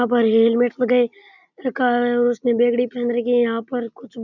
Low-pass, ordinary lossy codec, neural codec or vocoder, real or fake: 7.2 kHz; none; none; real